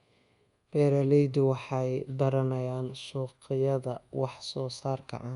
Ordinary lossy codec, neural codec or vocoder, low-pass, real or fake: Opus, 64 kbps; codec, 24 kHz, 1.2 kbps, DualCodec; 10.8 kHz; fake